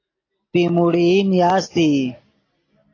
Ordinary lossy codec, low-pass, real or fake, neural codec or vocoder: AAC, 32 kbps; 7.2 kHz; real; none